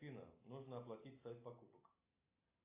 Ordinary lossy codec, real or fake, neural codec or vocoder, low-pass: AAC, 32 kbps; real; none; 3.6 kHz